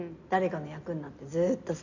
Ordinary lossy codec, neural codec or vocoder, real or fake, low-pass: none; none; real; 7.2 kHz